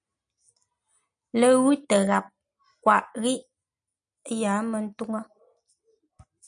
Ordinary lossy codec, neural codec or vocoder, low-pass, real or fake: MP3, 96 kbps; none; 9.9 kHz; real